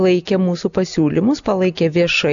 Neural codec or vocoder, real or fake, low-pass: none; real; 7.2 kHz